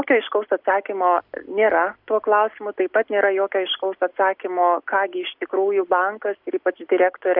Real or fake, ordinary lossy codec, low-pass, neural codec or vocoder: real; AAC, 48 kbps; 5.4 kHz; none